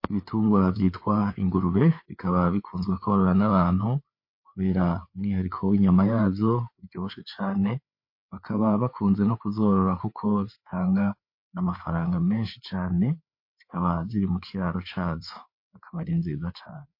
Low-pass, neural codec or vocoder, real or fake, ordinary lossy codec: 5.4 kHz; codec, 16 kHz, 4 kbps, FreqCodec, larger model; fake; MP3, 32 kbps